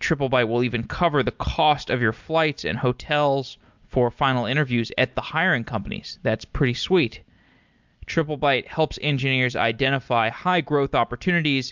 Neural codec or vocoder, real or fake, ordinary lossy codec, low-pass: none; real; MP3, 64 kbps; 7.2 kHz